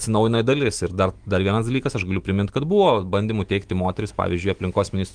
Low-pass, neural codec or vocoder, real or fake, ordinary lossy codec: 9.9 kHz; autoencoder, 48 kHz, 128 numbers a frame, DAC-VAE, trained on Japanese speech; fake; Opus, 24 kbps